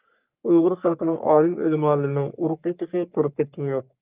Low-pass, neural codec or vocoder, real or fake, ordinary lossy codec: 3.6 kHz; codec, 44.1 kHz, 3.4 kbps, Pupu-Codec; fake; Opus, 24 kbps